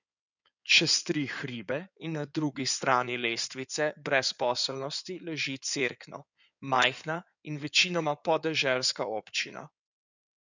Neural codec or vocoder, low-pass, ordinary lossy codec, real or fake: codec, 16 kHz in and 24 kHz out, 2.2 kbps, FireRedTTS-2 codec; 7.2 kHz; none; fake